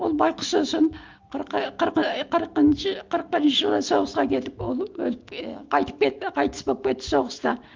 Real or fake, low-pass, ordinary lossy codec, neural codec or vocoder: fake; 7.2 kHz; Opus, 32 kbps; autoencoder, 48 kHz, 128 numbers a frame, DAC-VAE, trained on Japanese speech